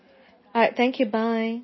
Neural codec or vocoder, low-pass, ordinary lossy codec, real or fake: none; 7.2 kHz; MP3, 24 kbps; real